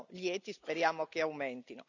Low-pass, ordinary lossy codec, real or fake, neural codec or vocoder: 7.2 kHz; none; real; none